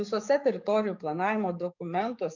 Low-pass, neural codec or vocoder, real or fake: 7.2 kHz; codec, 44.1 kHz, 7.8 kbps, DAC; fake